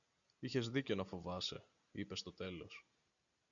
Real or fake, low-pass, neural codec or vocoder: real; 7.2 kHz; none